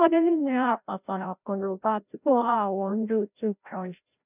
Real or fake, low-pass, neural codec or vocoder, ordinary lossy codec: fake; 3.6 kHz; codec, 16 kHz, 0.5 kbps, FreqCodec, larger model; none